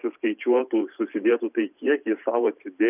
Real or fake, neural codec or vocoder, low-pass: fake; vocoder, 44.1 kHz, 128 mel bands every 512 samples, BigVGAN v2; 3.6 kHz